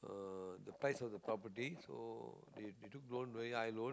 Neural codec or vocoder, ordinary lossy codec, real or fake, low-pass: none; none; real; none